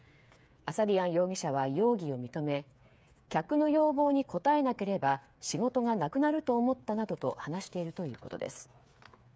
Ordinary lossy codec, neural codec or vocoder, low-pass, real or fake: none; codec, 16 kHz, 8 kbps, FreqCodec, smaller model; none; fake